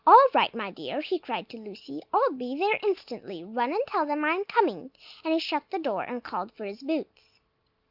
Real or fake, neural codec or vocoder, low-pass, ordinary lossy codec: real; none; 5.4 kHz; Opus, 24 kbps